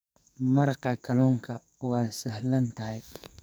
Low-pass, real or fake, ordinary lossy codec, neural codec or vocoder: none; fake; none; codec, 44.1 kHz, 2.6 kbps, SNAC